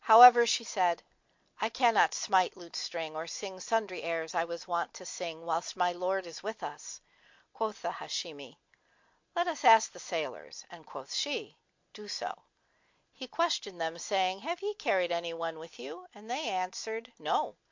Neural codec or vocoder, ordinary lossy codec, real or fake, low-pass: none; MP3, 48 kbps; real; 7.2 kHz